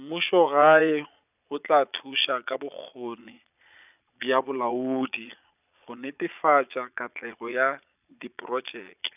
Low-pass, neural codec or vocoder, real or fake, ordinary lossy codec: 3.6 kHz; vocoder, 22.05 kHz, 80 mel bands, Vocos; fake; none